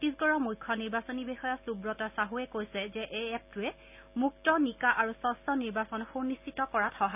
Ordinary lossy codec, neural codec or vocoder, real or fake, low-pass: none; none; real; 3.6 kHz